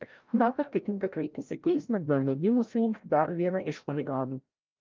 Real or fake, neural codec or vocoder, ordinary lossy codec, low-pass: fake; codec, 16 kHz, 0.5 kbps, FreqCodec, larger model; Opus, 32 kbps; 7.2 kHz